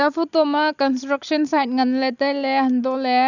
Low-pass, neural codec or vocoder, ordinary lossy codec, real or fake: 7.2 kHz; none; none; real